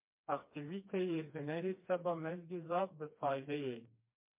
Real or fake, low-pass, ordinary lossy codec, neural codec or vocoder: fake; 3.6 kHz; MP3, 32 kbps; codec, 16 kHz, 2 kbps, FreqCodec, smaller model